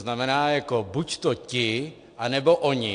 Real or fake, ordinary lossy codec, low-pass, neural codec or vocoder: real; AAC, 64 kbps; 9.9 kHz; none